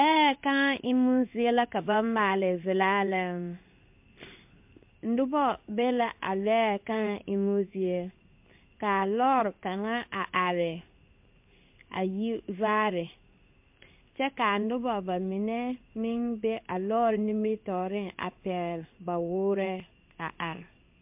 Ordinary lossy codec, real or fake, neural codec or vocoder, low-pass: MP3, 32 kbps; fake; codec, 16 kHz in and 24 kHz out, 1 kbps, XY-Tokenizer; 3.6 kHz